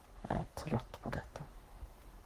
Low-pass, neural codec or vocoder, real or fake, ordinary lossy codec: 14.4 kHz; codec, 44.1 kHz, 3.4 kbps, Pupu-Codec; fake; Opus, 24 kbps